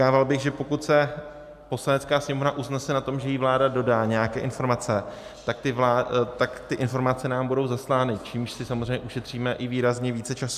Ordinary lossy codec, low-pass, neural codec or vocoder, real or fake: MP3, 96 kbps; 14.4 kHz; none; real